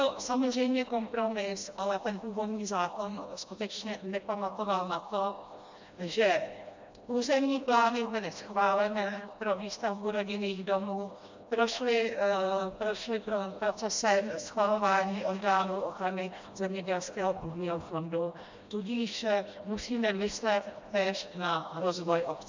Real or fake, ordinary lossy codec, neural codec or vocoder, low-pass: fake; MP3, 64 kbps; codec, 16 kHz, 1 kbps, FreqCodec, smaller model; 7.2 kHz